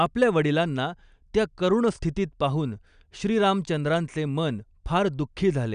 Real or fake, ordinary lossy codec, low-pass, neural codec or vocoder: real; none; 9.9 kHz; none